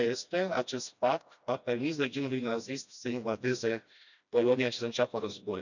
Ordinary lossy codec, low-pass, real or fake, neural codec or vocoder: none; 7.2 kHz; fake; codec, 16 kHz, 1 kbps, FreqCodec, smaller model